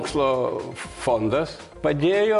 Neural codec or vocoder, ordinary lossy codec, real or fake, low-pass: none; MP3, 64 kbps; real; 10.8 kHz